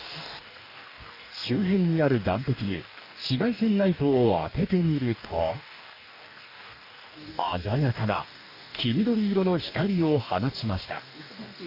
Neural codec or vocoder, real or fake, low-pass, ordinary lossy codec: codec, 44.1 kHz, 2.6 kbps, DAC; fake; 5.4 kHz; none